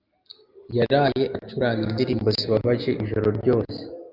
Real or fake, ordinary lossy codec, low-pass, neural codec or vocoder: real; Opus, 24 kbps; 5.4 kHz; none